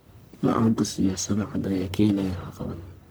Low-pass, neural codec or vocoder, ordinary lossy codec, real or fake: none; codec, 44.1 kHz, 1.7 kbps, Pupu-Codec; none; fake